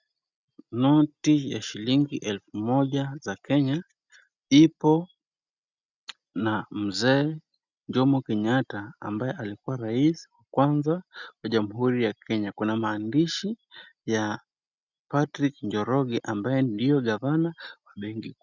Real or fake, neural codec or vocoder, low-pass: real; none; 7.2 kHz